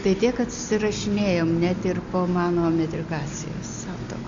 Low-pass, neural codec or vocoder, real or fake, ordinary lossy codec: 7.2 kHz; none; real; AAC, 64 kbps